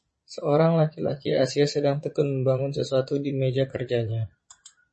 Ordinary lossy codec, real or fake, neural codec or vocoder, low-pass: MP3, 32 kbps; fake; vocoder, 22.05 kHz, 80 mel bands, Vocos; 9.9 kHz